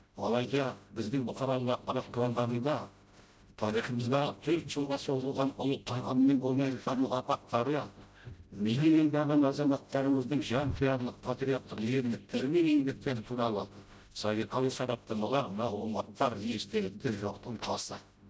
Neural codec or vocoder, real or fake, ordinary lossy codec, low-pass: codec, 16 kHz, 0.5 kbps, FreqCodec, smaller model; fake; none; none